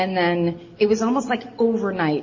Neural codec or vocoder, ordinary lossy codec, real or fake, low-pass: none; MP3, 32 kbps; real; 7.2 kHz